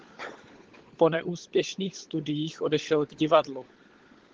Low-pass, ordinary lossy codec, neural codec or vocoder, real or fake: 7.2 kHz; Opus, 16 kbps; codec, 16 kHz, 16 kbps, FunCodec, trained on LibriTTS, 50 frames a second; fake